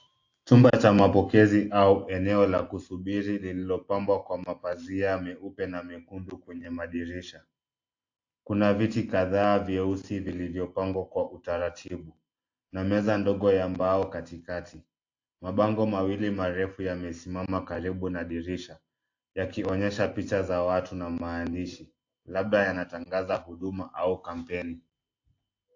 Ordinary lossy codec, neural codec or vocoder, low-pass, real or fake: AAC, 48 kbps; none; 7.2 kHz; real